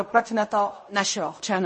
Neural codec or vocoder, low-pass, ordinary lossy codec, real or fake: codec, 16 kHz in and 24 kHz out, 0.4 kbps, LongCat-Audio-Codec, fine tuned four codebook decoder; 10.8 kHz; MP3, 32 kbps; fake